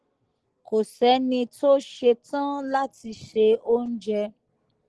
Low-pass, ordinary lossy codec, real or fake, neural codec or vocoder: 10.8 kHz; Opus, 16 kbps; real; none